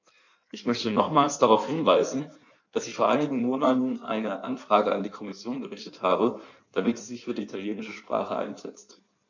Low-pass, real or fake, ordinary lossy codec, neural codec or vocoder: 7.2 kHz; fake; none; codec, 16 kHz in and 24 kHz out, 1.1 kbps, FireRedTTS-2 codec